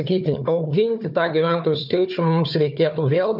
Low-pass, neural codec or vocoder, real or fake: 5.4 kHz; codec, 16 kHz, 4 kbps, FunCodec, trained on Chinese and English, 50 frames a second; fake